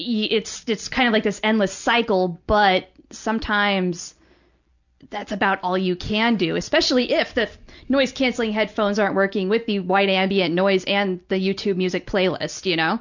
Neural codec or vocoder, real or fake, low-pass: none; real; 7.2 kHz